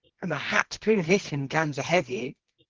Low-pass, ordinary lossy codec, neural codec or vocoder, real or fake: 7.2 kHz; Opus, 16 kbps; codec, 24 kHz, 0.9 kbps, WavTokenizer, medium music audio release; fake